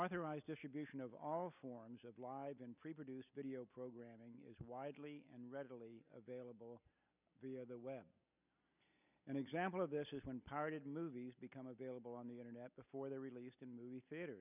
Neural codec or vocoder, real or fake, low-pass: none; real; 3.6 kHz